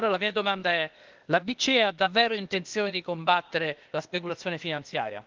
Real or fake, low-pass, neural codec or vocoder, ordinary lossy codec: fake; 7.2 kHz; codec, 16 kHz, 0.8 kbps, ZipCodec; Opus, 32 kbps